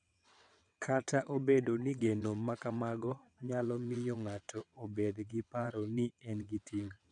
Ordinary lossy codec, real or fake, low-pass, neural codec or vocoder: none; fake; 9.9 kHz; vocoder, 22.05 kHz, 80 mel bands, WaveNeXt